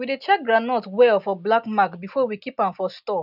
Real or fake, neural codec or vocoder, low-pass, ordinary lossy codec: real; none; 5.4 kHz; none